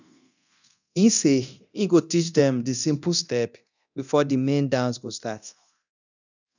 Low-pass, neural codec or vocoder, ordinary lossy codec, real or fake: 7.2 kHz; codec, 24 kHz, 0.9 kbps, DualCodec; none; fake